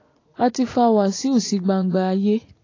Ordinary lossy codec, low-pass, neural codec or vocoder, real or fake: AAC, 32 kbps; 7.2 kHz; vocoder, 44.1 kHz, 80 mel bands, Vocos; fake